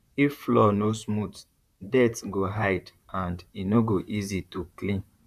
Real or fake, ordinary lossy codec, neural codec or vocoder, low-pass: fake; none; vocoder, 44.1 kHz, 128 mel bands, Pupu-Vocoder; 14.4 kHz